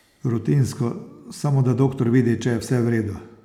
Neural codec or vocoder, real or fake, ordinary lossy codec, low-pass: none; real; none; 19.8 kHz